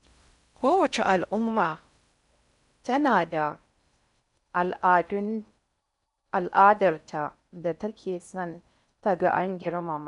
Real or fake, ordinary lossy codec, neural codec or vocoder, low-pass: fake; none; codec, 16 kHz in and 24 kHz out, 0.6 kbps, FocalCodec, streaming, 4096 codes; 10.8 kHz